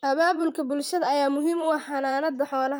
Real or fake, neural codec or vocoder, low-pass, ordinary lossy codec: fake; vocoder, 44.1 kHz, 128 mel bands, Pupu-Vocoder; none; none